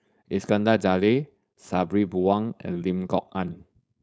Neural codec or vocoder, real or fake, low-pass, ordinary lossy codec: codec, 16 kHz, 4.8 kbps, FACodec; fake; none; none